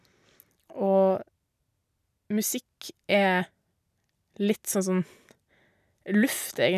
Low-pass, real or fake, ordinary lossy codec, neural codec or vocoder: 14.4 kHz; real; none; none